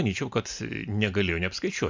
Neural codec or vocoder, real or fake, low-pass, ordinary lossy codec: none; real; 7.2 kHz; MP3, 64 kbps